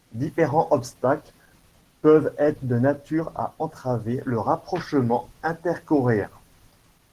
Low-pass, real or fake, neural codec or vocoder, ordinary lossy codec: 14.4 kHz; real; none; Opus, 16 kbps